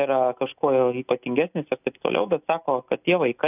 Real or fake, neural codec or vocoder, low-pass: real; none; 3.6 kHz